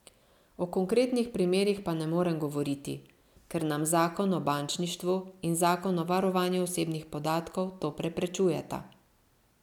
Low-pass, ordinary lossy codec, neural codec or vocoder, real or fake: 19.8 kHz; none; none; real